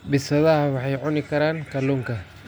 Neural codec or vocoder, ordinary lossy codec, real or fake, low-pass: none; none; real; none